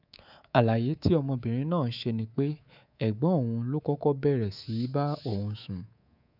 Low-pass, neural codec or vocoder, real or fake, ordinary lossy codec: 5.4 kHz; codec, 24 kHz, 3.1 kbps, DualCodec; fake; none